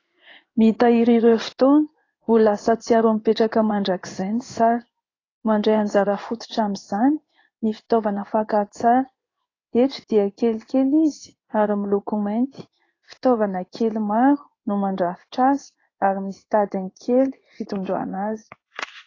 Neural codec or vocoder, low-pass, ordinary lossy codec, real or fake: codec, 16 kHz in and 24 kHz out, 1 kbps, XY-Tokenizer; 7.2 kHz; AAC, 32 kbps; fake